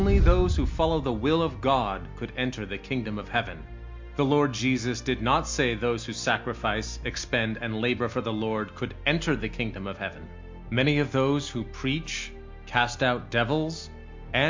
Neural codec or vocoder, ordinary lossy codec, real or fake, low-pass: none; MP3, 48 kbps; real; 7.2 kHz